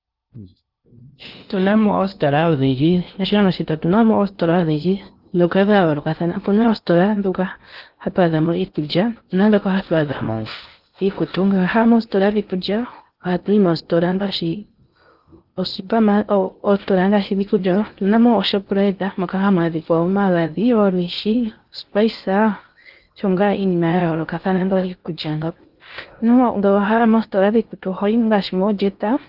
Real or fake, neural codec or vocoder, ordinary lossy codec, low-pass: fake; codec, 16 kHz in and 24 kHz out, 0.8 kbps, FocalCodec, streaming, 65536 codes; Opus, 32 kbps; 5.4 kHz